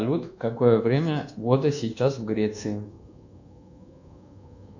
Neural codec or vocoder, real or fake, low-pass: codec, 24 kHz, 1.2 kbps, DualCodec; fake; 7.2 kHz